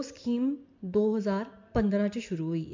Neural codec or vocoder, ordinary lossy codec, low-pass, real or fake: none; MP3, 48 kbps; 7.2 kHz; real